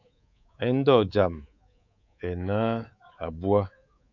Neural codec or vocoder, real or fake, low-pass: codec, 24 kHz, 3.1 kbps, DualCodec; fake; 7.2 kHz